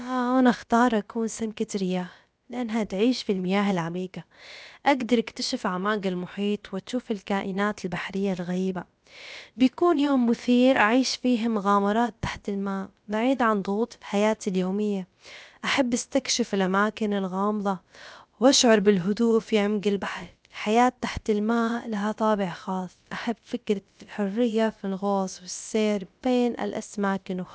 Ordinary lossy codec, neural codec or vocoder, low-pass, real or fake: none; codec, 16 kHz, about 1 kbps, DyCAST, with the encoder's durations; none; fake